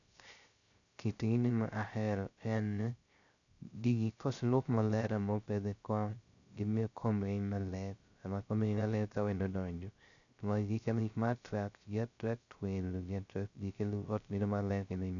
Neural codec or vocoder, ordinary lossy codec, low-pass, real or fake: codec, 16 kHz, 0.3 kbps, FocalCodec; none; 7.2 kHz; fake